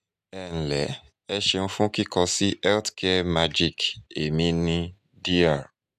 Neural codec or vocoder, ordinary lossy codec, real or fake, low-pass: none; none; real; 14.4 kHz